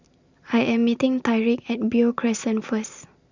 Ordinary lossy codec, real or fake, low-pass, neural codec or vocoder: Opus, 64 kbps; real; 7.2 kHz; none